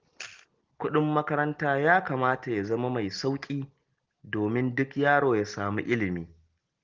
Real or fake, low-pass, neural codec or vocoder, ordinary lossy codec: real; 7.2 kHz; none; Opus, 16 kbps